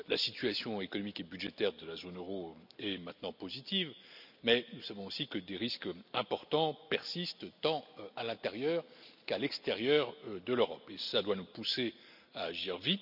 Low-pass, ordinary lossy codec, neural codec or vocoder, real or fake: 5.4 kHz; none; none; real